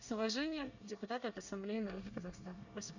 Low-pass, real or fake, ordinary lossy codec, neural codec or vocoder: 7.2 kHz; fake; none; codec, 24 kHz, 1 kbps, SNAC